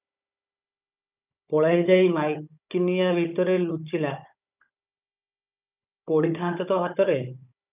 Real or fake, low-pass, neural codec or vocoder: fake; 3.6 kHz; codec, 16 kHz, 16 kbps, FunCodec, trained on Chinese and English, 50 frames a second